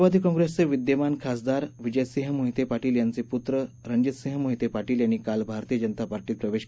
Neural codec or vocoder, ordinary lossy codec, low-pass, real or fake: none; none; none; real